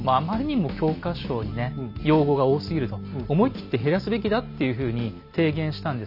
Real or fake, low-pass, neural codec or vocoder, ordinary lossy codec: real; 5.4 kHz; none; none